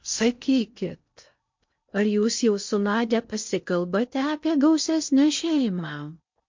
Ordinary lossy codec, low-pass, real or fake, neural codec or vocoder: MP3, 48 kbps; 7.2 kHz; fake; codec, 16 kHz in and 24 kHz out, 0.8 kbps, FocalCodec, streaming, 65536 codes